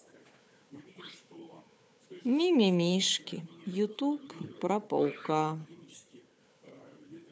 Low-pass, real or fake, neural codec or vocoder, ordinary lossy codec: none; fake; codec, 16 kHz, 4 kbps, FunCodec, trained on Chinese and English, 50 frames a second; none